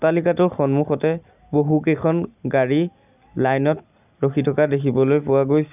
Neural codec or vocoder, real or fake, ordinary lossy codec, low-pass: none; real; none; 3.6 kHz